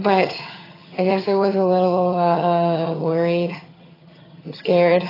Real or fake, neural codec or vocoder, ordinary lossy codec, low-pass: fake; vocoder, 22.05 kHz, 80 mel bands, HiFi-GAN; AAC, 24 kbps; 5.4 kHz